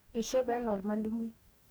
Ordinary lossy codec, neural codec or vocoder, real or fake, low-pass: none; codec, 44.1 kHz, 2.6 kbps, DAC; fake; none